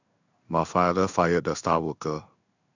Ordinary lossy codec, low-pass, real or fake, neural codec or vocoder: none; 7.2 kHz; fake; codec, 16 kHz in and 24 kHz out, 1 kbps, XY-Tokenizer